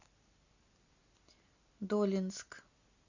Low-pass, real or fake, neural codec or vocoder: 7.2 kHz; real; none